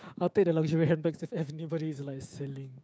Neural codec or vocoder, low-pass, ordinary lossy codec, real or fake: codec, 16 kHz, 6 kbps, DAC; none; none; fake